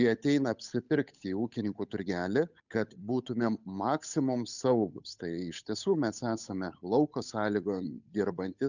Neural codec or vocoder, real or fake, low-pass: codec, 16 kHz, 8 kbps, FunCodec, trained on Chinese and English, 25 frames a second; fake; 7.2 kHz